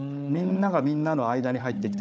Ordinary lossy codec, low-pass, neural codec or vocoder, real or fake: none; none; codec, 16 kHz, 4 kbps, FunCodec, trained on Chinese and English, 50 frames a second; fake